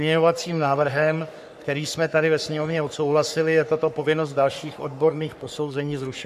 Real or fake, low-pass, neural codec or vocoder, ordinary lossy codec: fake; 14.4 kHz; codec, 44.1 kHz, 3.4 kbps, Pupu-Codec; MP3, 96 kbps